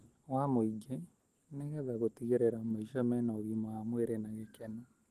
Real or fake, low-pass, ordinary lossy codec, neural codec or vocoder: real; 14.4 kHz; Opus, 16 kbps; none